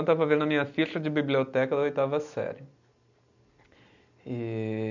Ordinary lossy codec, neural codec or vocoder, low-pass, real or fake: none; none; 7.2 kHz; real